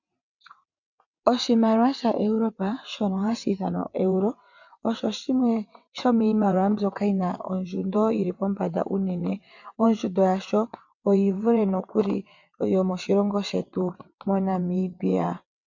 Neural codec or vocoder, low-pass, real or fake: vocoder, 22.05 kHz, 80 mel bands, WaveNeXt; 7.2 kHz; fake